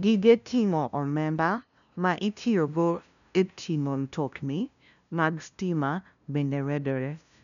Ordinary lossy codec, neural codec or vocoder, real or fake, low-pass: none; codec, 16 kHz, 0.5 kbps, FunCodec, trained on LibriTTS, 25 frames a second; fake; 7.2 kHz